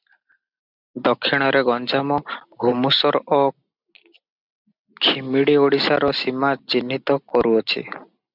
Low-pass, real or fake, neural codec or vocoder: 5.4 kHz; real; none